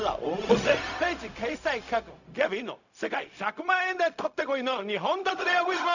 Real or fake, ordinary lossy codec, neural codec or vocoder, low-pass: fake; none; codec, 16 kHz, 0.4 kbps, LongCat-Audio-Codec; 7.2 kHz